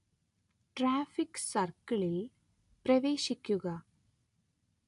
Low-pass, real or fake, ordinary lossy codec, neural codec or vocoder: 10.8 kHz; real; AAC, 64 kbps; none